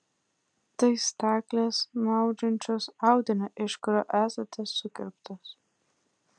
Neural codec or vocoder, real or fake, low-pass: none; real; 9.9 kHz